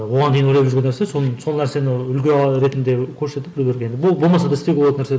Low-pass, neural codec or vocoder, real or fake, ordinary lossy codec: none; none; real; none